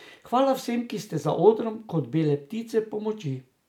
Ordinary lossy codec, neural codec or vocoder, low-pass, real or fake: none; none; 19.8 kHz; real